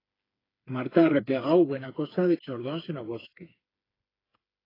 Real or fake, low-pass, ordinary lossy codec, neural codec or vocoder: fake; 5.4 kHz; AAC, 24 kbps; codec, 16 kHz, 4 kbps, FreqCodec, smaller model